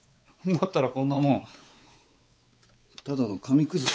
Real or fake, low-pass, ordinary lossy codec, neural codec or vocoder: fake; none; none; codec, 16 kHz, 4 kbps, X-Codec, WavLM features, trained on Multilingual LibriSpeech